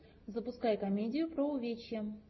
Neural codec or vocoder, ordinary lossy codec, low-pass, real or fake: none; MP3, 24 kbps; 7.2 kHz; real